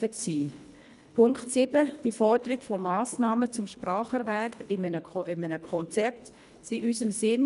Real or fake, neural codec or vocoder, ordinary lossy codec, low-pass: fake; codec, 24 kHz, 1.5 kbps, HILCodec; none; 10.8 kHz